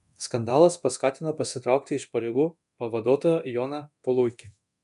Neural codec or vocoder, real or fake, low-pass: codec, 24 kHz, 0.9 kbps, DualCodec; fake; 10.8 kHz